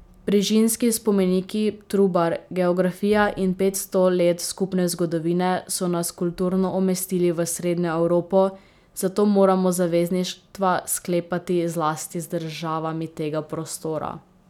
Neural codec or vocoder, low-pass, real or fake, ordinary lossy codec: none; 19.8 kHz; real; none